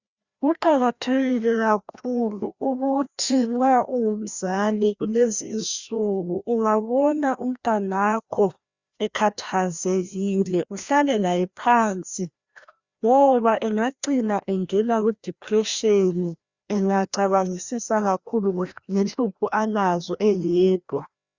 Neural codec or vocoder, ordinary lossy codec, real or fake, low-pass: codec, 16 kHz, 1 kbps, FreqCodec, larger model; Opus, 64 kbps; fake; 7.2 kHz